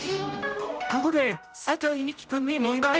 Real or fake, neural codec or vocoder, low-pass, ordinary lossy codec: fake; codec, 16 kHz, 0.5 kbps, X-Codec, HuBERT features, trained on general audio; none; none